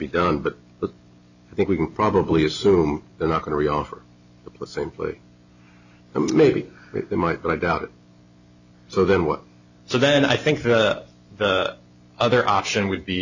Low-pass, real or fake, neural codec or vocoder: 7.2 kHz; real; none